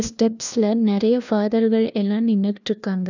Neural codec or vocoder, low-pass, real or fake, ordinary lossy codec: codec, 16 kHz, 1 kbps, FunCodec, trained on LibriTTS, 50 frames a second; 7.2 kHz; fake; none